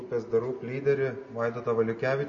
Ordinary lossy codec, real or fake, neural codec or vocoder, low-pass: MP3, 32 kbps; real; none; 7.2 kHz